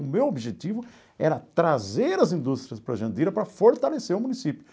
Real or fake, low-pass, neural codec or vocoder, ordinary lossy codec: real; none; none; none